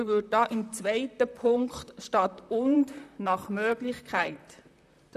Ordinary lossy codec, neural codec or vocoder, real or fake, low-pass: none; vocoder, 44.1 kHz, 128 mel bands, Pupu-Vocoder; fake; 14.4 kHz